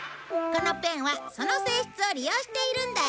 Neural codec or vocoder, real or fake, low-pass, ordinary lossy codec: none; real; none; none